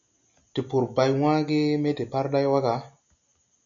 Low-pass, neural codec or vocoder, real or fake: 7.2 kHz; none; real